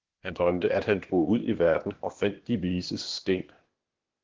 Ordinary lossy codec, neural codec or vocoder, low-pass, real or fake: Opus, 16 kbps; codec, 16 kHz, 0.8 kbps, ZipCodec; 7.2 kHz; fake